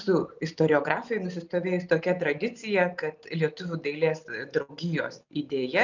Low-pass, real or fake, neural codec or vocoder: 7.2 kHz; real; none